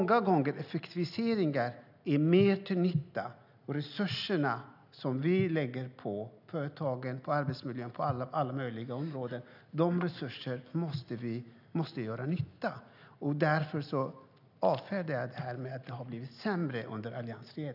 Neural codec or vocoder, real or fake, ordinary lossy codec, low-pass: none; real; none; 5.4 kHz